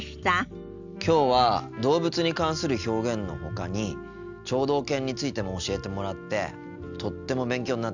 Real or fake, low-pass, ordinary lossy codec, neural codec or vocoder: real; 7.2 kHz; none; none